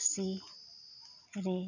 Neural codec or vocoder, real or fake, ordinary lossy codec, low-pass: none; real; none; 7.2 kHz